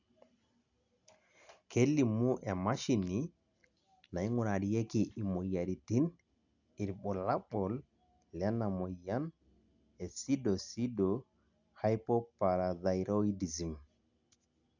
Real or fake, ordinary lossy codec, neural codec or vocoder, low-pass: real; none; none; 7.2 kHz